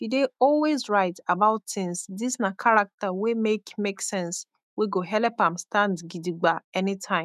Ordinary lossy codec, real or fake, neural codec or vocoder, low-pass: none; fake; autoencoder, 48 kHz, 128 numbers a frame, DAC-VAE, trained on Japanese speech; 14.4 kHz